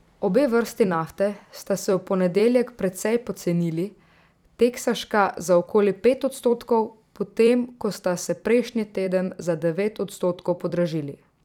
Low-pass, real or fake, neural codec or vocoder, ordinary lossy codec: 19.8 kHz; fake; vocoder, 44.1 kHz, 128 mel bands every 256 samples, BigVGAN v2; none